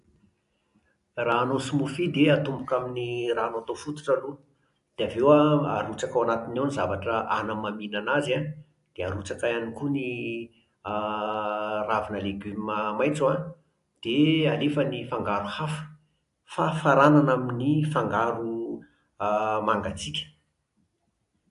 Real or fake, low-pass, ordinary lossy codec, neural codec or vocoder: real; 10.8 kHz; AAC, 64 kbps; none